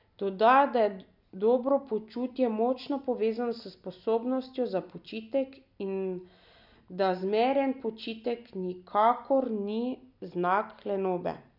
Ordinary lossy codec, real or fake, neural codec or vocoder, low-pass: none; real; none; 5.4 kHz